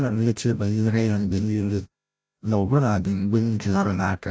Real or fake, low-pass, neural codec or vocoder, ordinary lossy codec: fake; none; codec, 16 kHz, 0.5 kbps, FreqCodec, larger model; none